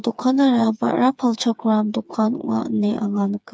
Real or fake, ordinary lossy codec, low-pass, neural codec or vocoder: fake; none; none; codec, 16 kHz, 4 kbps, FreqCodec, smaller model